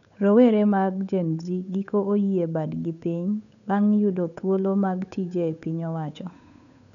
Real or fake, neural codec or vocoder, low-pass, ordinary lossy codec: fake; codec, 16 kHz, 8 kbps, FunCodec, trained on Chinese and English, 25 frames a second; 7.2 kHz; none